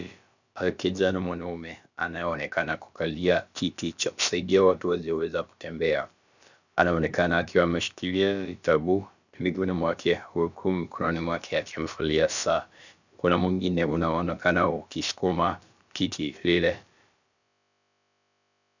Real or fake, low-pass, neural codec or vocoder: fake; 7.2 kHz; codec, 16 kHz, about 1 kbps, DyCAST, with the encoder's durations